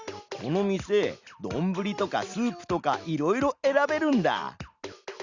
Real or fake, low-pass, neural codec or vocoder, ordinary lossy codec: real; 7.2 kHz; none; Opus, 64 kbps